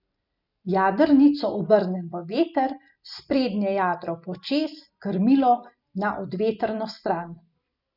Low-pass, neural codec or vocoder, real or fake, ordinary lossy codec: 5.4 kHz; none; real; none